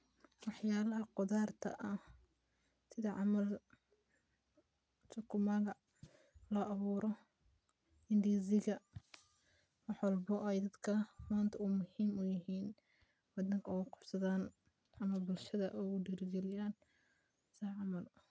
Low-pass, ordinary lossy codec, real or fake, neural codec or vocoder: none; none; real; none